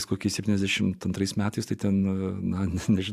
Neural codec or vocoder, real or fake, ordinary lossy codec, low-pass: vocoder, 44.1 kHz, 128 mel bands every 512 samples, BigVGAN v2; fake; AAC, 96 kbps; 14.4 kHz